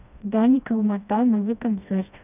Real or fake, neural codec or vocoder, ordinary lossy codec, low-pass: fake; codec, 16 kHz, 1 kbps, FreqCodec, smaller model; none; 3.6 kHz